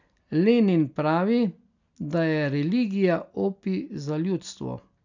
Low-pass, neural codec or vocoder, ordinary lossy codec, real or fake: 7.2 kHz; none; none; real